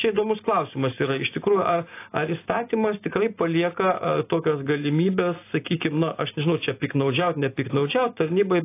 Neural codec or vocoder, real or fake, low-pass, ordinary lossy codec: none; real; 3.6 kHz; AAC, 24 kbps